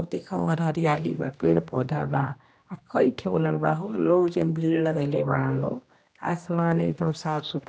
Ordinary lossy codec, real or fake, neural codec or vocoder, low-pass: none; fake; codec, 16 kHz, 1 kbps, X-Codec, HuBERT features, trained on general audio; none